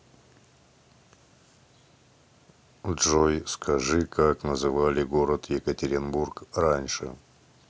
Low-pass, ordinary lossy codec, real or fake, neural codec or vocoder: none; none; real; none